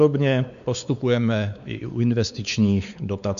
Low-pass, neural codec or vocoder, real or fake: 7.2 kHz; codec, 16 kHz, 4 kbps, X-Codec, WavLM features, trained on Multilingual LibriSpeech; fake